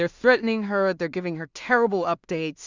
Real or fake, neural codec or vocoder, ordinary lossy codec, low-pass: fake; codec, 16 kHz in and 24 kHz out, 0.4 kbps, LongCat-Audio-Codec, two codebook decoder; Opus, 64 kbps; 7.2 kHz